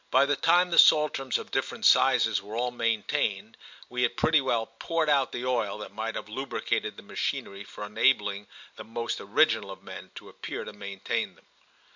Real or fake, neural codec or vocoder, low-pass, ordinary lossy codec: real; none; 7.2 kHz; MP3, 64 kbps